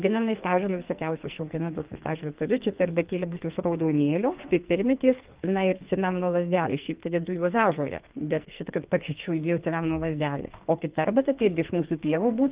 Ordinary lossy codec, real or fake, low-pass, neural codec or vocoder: Opus, 32 kbps; fake; 3.6 kHz; codec, 44.1 kHz, 2.6 kbps, SNAC